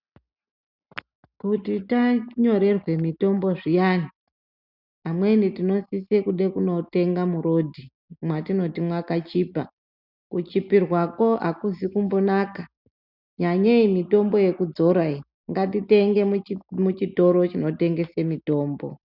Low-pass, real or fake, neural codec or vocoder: 5.4 kHz; real; none